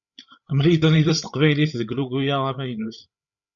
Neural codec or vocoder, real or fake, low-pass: codec, 16 kHz, 8 kbps, FreqCodec, larger model; fake; 7.2 kHz